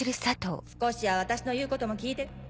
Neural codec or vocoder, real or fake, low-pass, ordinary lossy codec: none; real; none; none